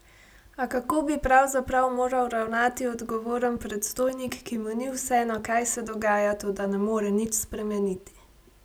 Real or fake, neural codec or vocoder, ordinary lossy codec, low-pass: real; none; none; none